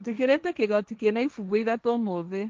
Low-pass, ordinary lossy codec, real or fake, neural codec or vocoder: 7.2 kHz; Opus, 24 kbps; fake; codec, 16 kHz, 1.1 kbps, Voila-Tokenizer